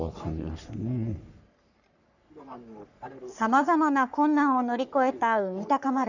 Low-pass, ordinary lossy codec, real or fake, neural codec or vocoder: 7.2 kHz; none; fake; codec, 44.1 kHz, 3.4 kbps, Pupu-Codec